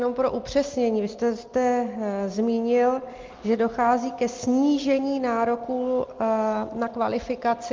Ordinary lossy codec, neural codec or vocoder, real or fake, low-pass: Opus, 24 kbps; none; real; 7.2 kHz